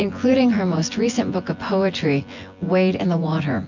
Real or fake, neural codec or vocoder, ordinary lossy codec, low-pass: fake; vocoder, 24 kHz, 100 mel bands, Vocos; MP3, 48 kbps; 7.2 kHz